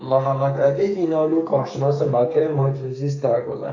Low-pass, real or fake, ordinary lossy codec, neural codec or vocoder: 7.2 kHz; fake; AAC, 48 kbps; codec, 32 kHz, 1.9 kbps, SNAC